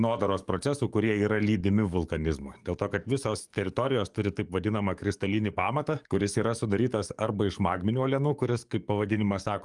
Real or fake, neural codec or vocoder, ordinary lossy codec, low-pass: fake; codec, 44.1 kHz, 7.8 kbps, DAC; Opus, 32 kbps; 10.8 kHz